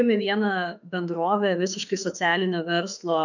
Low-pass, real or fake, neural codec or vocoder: 7.2 kHz; fake; autoencoder, 48 kHz, 32 numbers a frame, DAC-VAE, trained on Japanese speech